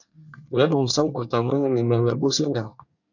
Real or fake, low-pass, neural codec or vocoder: fake; 7.2 kHz; codec, 24 kHz, 1 kbps, SNAC